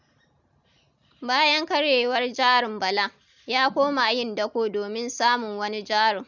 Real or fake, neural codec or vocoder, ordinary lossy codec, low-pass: real; none; none; 7.2 kHz